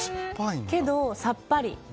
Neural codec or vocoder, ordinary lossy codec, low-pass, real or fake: none; none; none; real